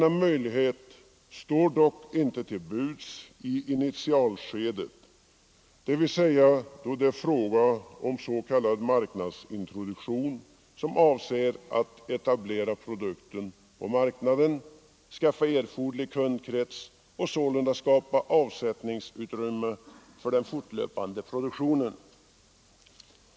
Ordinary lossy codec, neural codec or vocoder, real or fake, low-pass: none; none; real; none